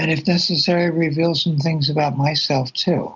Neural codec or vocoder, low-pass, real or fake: none; 7.2 kHz; real